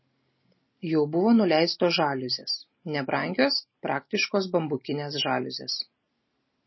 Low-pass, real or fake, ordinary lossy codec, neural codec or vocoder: 7.2 kHz; real; MP3, 24 kbps; none